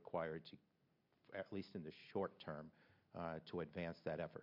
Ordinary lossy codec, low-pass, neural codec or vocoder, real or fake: MP3, 48 kbps; 5.4 kHz; none; real